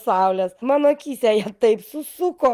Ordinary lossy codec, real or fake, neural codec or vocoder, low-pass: Opus, 32 kbps; real; none; 14.4 kHz